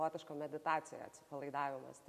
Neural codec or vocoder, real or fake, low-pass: none; real; 14.4 kHz